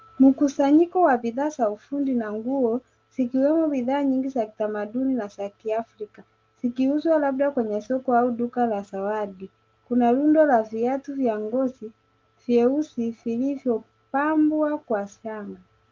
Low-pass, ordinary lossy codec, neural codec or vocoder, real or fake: 7.2 kHz; Opus, 32 kbps; none; real